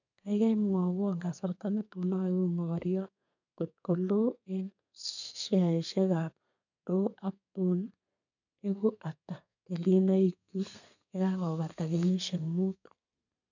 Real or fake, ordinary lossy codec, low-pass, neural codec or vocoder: fake; none; 7.2 kHz; codec, 32 kHz, 1.9 kbps, SNAC